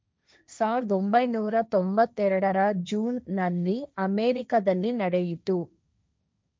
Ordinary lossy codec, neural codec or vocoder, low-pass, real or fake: none; codec, 16 kHz, 1.1 kbps, Voila-Tokenizer; none; fake